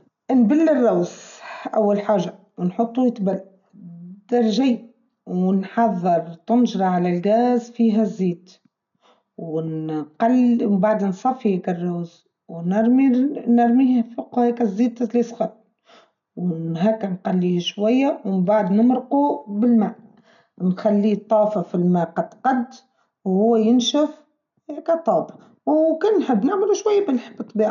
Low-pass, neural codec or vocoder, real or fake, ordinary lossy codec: 7.2 kHz; none; real; none